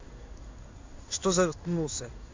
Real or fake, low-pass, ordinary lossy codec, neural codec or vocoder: fake; 7.2 kHz; MP3, 64 kbps; codec, 16 kHz in and 24 kHz out, 1 kbps, XY-Tokenizer